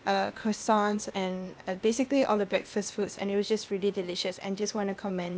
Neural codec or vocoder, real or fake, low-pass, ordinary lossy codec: codec, 16 kHz, 0.8 kbps, ZipCodec; fake; none; none